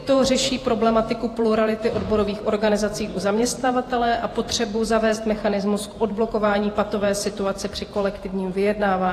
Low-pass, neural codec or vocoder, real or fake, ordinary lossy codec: 14.4 kHz; vocoder, 48 kHz, 128 mel bands, Vocos; fake; AAC, 48 kbps